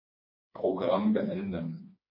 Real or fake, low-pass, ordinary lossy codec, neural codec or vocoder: fake; 5.4 kHz; MP3, 24 kbps; codec, 16 kHz, 2 kbps, FreqCodec, smaller model